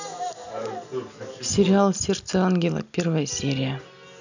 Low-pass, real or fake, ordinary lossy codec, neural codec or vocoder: 7.2 kHz; real; none; none